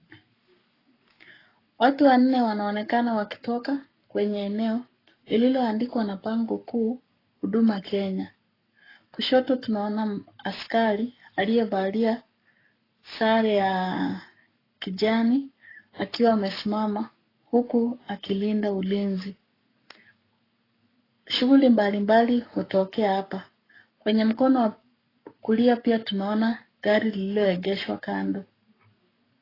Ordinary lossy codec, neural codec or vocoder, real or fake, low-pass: AAC, 24 kbps; codec, 44.1 kHz, 7.8 kbps, Pupu-Codec; fake; 5.4 kHz